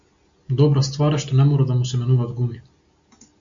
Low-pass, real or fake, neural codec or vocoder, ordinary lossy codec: 7.2 kHz; real; none; AAC, 64 kbps